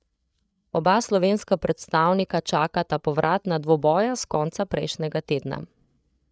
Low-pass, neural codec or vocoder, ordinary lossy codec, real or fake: none; codec, 16 kHz, 8 kbps, FreqCodec, larger model; none; fake